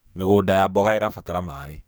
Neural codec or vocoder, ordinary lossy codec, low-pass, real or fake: codec, 44.1 kHz, 2.6 kbps, SNAC; none; none; fake